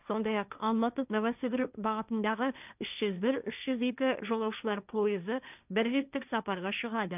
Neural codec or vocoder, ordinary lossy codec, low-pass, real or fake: codec, 16 kHz, 1.1 kbps, Voila-Tokenizer; none; 3.6 kHz; fake